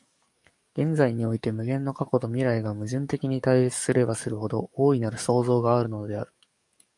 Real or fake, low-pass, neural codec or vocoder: fake; 10.8 kHz; codec, 44.1 kHz, 7.8 kbps, DAC